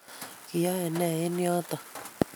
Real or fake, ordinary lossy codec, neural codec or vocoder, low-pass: real; none; none; none